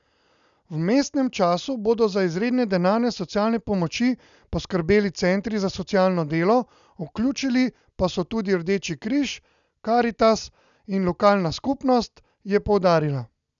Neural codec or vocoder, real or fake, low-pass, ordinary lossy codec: none; real; 7.2 kHz; none